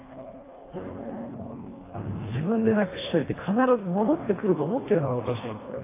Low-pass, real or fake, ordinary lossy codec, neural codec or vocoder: 3.6 kHz; fake; AAC, 16 kbps; codec, 24 kHz, 1.5 kbps, HILCodec